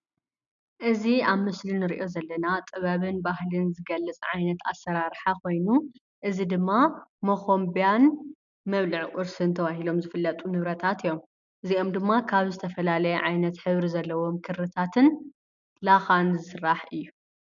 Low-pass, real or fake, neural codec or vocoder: 7.2 kHz; real; none